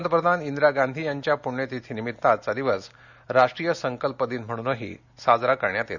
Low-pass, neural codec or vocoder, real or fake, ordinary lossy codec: 7.2 kHz; none; real; none